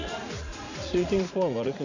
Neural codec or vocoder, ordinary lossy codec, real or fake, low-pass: vocoder, 44.1 kHz, 80 mel bands, Vocos; none; fake; 7.2 kHz